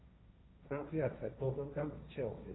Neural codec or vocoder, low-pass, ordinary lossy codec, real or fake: codec, 16 kHz, 1.1 kbps, Voila-Tokenizer; 7.2 kHz; AAC, 16 kbps; fake